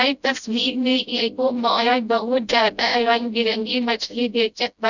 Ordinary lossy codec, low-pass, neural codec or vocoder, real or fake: none; 7.2 kHz; codec, 16 kHz, 0.5 kbps, FreqCodec, smaller model; fake